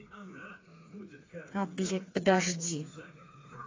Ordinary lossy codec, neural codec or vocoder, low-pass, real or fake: AAC, 32 kbps; codec, 16 kHz, 4 kbps, FreqCodec, smaller model; 7.2 kHz; fake